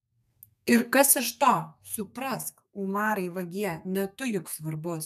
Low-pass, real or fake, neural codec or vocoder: 14.4 kHz; fake; codec, 32 kHz, 1.9 kbps, SNAC